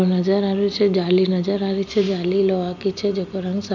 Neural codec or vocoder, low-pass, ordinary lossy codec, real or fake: none; 7.2 kHz; none; real